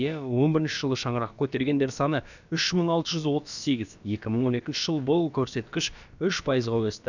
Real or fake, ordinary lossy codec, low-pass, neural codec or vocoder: fake; none; 7.2 kHz; codec, 16 kHz, about 1 kbps, DyCAST, with the encoder's durations